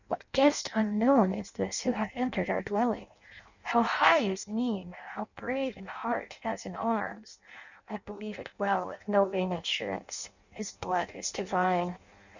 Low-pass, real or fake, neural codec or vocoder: 7.2 kHz; fake; codec, 16 kHz in and 24 kHz out, 0.6 kbps, FireRedTTS-2 codec